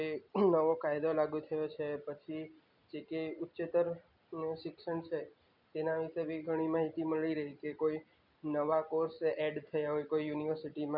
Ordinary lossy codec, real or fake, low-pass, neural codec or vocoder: none; real; 5.4 kHz; none